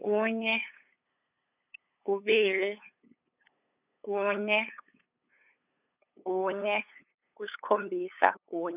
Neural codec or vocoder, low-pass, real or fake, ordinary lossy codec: codec, 16 kHz, 8 kbps, FunCodec, trained on LibriTTS, 25 frames a second; 3.6 kHz; fake; none